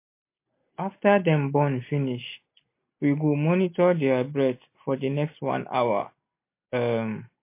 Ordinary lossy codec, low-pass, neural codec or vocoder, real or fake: MP3, 24 kbps; 3.6 kHz; none; real